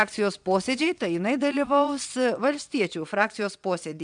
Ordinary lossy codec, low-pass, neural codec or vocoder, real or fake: MP3, 96 kbps; 9.9 kHz; vocoder, 22.05 kHz, 80 mel bands, Vocos; fake